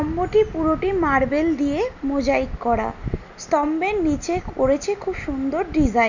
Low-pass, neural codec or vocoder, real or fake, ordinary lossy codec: 7.2 kHz; none; real; none